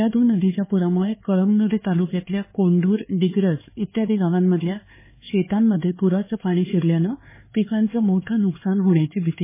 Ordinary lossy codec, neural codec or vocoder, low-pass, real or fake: MP3, 16 kbps; codec, 16 kHz, 4 kbps, X-Codec, HuBERT features, trained on LibriSpeech; 3.6 kHz; fake